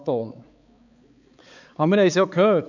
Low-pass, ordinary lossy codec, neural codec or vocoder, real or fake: 7.2 kHz; none; codec, 16 kHz, 4 kbps, X-Codec, HuBERT features, trained on balanced general audio; fake